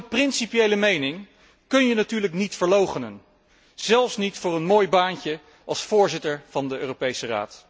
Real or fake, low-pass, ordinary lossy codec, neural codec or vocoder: real; none; none; none